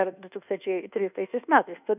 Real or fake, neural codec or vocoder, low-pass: fake; codec, 24 kHz, 1.2 kbps, DualCodec; 3.6 kHz